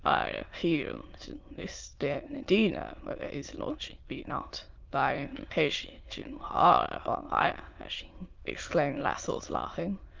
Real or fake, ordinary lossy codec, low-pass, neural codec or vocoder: fake; Opus, 32 kbps; 7.2 kHz; autoencoder, 22.05 kHz, a latent of 192 numbers a frame, VITS, trained on many speakers